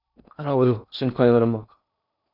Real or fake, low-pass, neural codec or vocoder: fake; 5.4 kHz; codec, 16 kHz in and 24 kHz out, 0.6 kbps, FocalCodec, streaming, 2048 codes